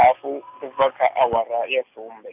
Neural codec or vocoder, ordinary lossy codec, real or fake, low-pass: none; AAC, 32 kbps; real; 3.6 kHz